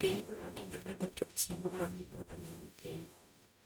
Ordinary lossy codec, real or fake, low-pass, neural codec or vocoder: none; fake; none; codec, 44.1 kHz, 0.9 kbps, DAC